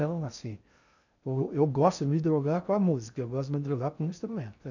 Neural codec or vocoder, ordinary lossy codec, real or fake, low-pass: codec, 16 kHz in and 24 kHz out, 0.6 kbps, FocalCodec, streaming, 4096 codes; none; fake; 7.2 kHz